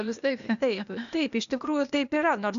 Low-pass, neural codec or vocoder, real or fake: 7.2 kHz; codec, 16 kHz, 0.8 kbps, ZipCodec; fake